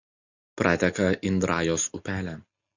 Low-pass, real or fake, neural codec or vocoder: 7.2 kHz; real; none